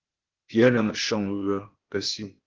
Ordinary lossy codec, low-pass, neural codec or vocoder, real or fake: Opus, 16 kbps; 7.2 kHz; codec, 16 kHz, 0.8 kbps, ZipCodec; fake